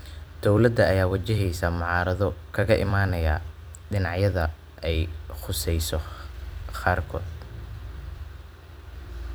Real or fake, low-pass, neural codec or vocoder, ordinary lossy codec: fake; none; vocoder, 44.1 kHz, 128 mel bands every 256 samples, BigVGAN v2; none